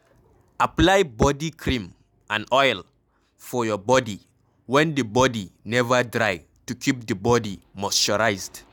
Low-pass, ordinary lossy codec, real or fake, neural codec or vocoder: 19.8 kHz; none; real; none